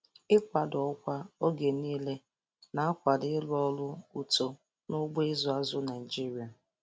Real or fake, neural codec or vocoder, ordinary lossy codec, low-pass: real; none; none; none